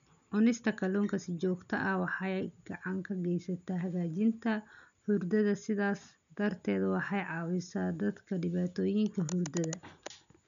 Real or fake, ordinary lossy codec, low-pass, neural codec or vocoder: real; none; 7.2 kHz; none